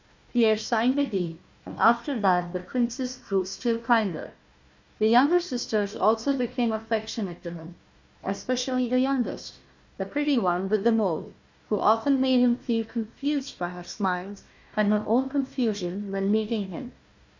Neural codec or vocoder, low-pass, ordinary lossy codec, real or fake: codec, 16 kHz, 1 kbps, FunCodec, trained on Chinese and English, 50 frames a second; 7.2 kHz; MP3, 64 kbps; fake